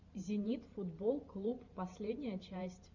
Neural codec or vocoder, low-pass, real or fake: vocoder, 44.1 kHz, 128 mel bands every 512 samples, BigVGAN v2; 7.2 kHz; fake